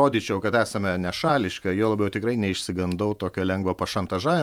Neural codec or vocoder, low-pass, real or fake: vocoder, 48 kHz, 128 mel bands, Vocos; 19.8 kHz; fake